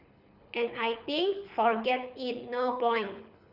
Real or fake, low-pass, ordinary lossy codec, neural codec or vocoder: fake; 5.4 kHz; none; codec, 24 kHz, 6 kbps, HILCodec